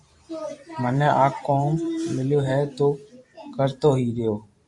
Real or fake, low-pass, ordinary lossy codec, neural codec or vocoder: fake; 10.8 kHz; AAC, 64 kbps; vocoder, 44.1 kHz, 128 mel bands every 512 samples, BigVGAN v2